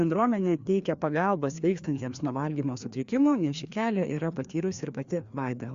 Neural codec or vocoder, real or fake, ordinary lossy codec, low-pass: codec, 16 kHz, 2 kbps, FreqCodec, larger model; fake; Opus, 64 kbps; 7.2 kHz